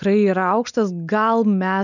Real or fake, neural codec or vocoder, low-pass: real; none; 7.2 kHz